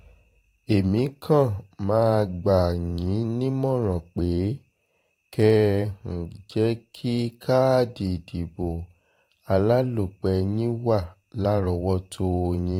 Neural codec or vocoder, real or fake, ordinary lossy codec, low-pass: vocoder, 44.1 kHz, 128 mel bands every 512 samples, BigVGAN v2; fake; AAC, 48 kbps; 19.8 kHz